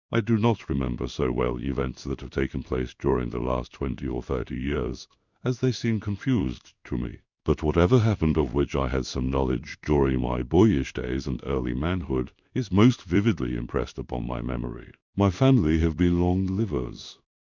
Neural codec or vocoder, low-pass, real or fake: codec, 16 kHz in and 24 kHz out, 1 kbps, XY-Tokenizer; 7.2 kHz; fake